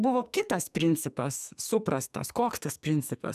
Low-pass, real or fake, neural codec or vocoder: 14.4 kHz; fake; codec, 44.1 kHz, 2.6 kbps, SNAC